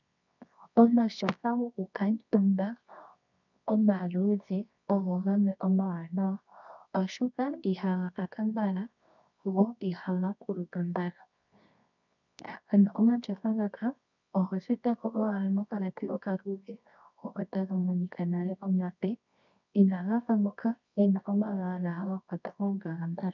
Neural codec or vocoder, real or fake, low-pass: codec, 24 kHz, 0.9 kbps, WavTokenizer, medium music audio release; fake; 7.2 kHz